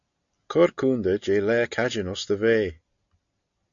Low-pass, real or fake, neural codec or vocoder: 7.2 kHz; real; none